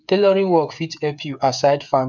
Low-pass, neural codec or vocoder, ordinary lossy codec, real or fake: 7.2 kHz; codec, 16 kHz, 8 kbps, FreqCodec, smaller model; none; fake